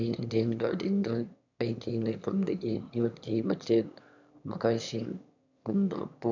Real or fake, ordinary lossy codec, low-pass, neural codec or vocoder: fake; none; 7.2 kHz; autoencoder, 22.05 kHz, a latent of 192 numbers a frame, VITS, trained on one speaker